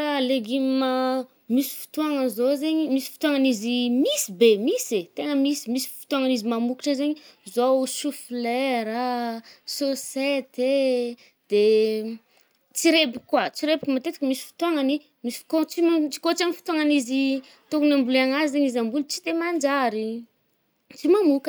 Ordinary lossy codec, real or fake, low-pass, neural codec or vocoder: none; real; none; none